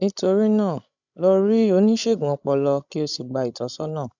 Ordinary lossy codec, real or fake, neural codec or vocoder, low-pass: none; fake; codec, 16 kHz, 16 kbps, FreqCodec, larger model; 7.2 kHz